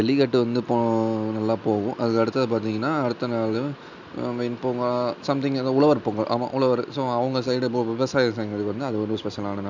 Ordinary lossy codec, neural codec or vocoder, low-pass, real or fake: none; none; 7.2 kHz; real